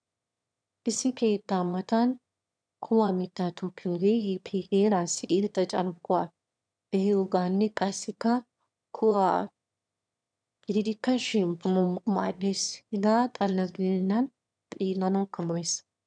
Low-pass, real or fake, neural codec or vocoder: 9.9 kHz; fake; autoencoder, 22.05 kHz, a latent of 192 numbers a frame, VITS, trained on one speaker